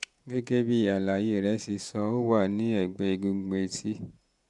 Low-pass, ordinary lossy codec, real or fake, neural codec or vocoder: 10.8 kHz; none; fake; vocoder, 48 kHz, 128 mel bands, Vocos